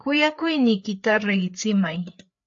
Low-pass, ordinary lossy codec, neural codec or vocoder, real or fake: 7.2 kHz; AAC, 64 kbps; codec, 16 kHz, 4 kbps, FreqCodec, larger model; fake